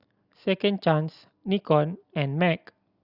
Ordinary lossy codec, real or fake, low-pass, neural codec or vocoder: Opus, 64 kbps; real; 5.4 kHz; none